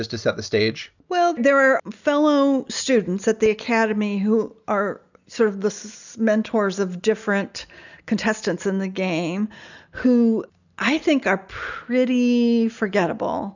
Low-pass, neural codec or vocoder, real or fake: 7.2 kHz; none; real